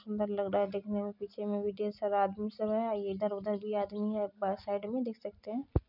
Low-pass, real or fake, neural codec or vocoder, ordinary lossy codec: 5.4 kHz; real; none; none